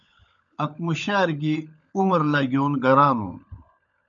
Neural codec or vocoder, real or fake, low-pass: codec, 16 kHz, 16 kbps, FunCodec, trained on LibriTTS, 50 frames a second; fake; 7.2 kHz